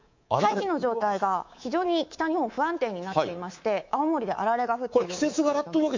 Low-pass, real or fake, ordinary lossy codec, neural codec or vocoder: 7.2 kHz; fake; MP3, 48 kbps; codec, 24 kHz, 3.1 kbps, DualCodec